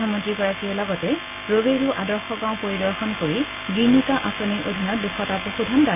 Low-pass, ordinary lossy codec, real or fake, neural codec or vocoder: 3.6 kHz; MP3, 32 kbps; real; none